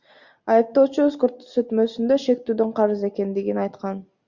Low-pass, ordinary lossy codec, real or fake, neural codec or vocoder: 7.2 kHz; Opus, 64 kbps; real; none